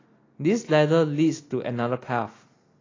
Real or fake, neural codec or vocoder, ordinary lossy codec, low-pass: real; none; AAC, 32 kbps; 7.2 kHz